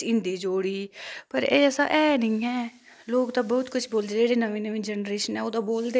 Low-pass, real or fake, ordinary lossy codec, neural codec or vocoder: none; real; none; none